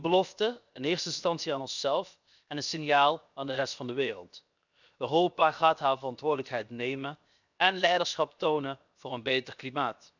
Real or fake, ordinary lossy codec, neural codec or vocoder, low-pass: fake; none; codec, 16 kHz, 0.7 kbps, FocalCodec; 7.2 kHz